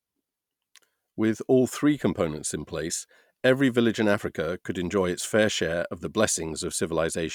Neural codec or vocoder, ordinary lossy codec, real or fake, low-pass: none; none; real; 19.8 kHz